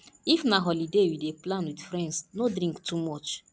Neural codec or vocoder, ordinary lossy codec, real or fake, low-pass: none; none; real; none